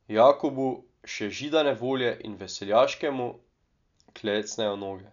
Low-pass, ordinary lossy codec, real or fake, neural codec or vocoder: 7.2 kHz; none; real; none